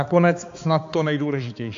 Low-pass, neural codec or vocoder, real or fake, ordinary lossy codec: 7.2 kHz; codec, 16 kHz, 4 kbps, X-Codec, HuBERT features, trained on balanced general audio; fake; AAC, 48 kbps